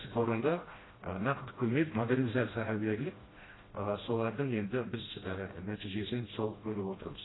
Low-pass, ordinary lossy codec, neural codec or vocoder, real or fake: 7.2 kHz; AAC, 16 kbps; codec, 16 kHz, 1 kbps, FreqCodec, smaller model; fake